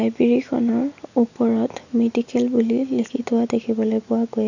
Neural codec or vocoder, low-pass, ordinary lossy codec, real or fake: none; 7.2 kHz; none; real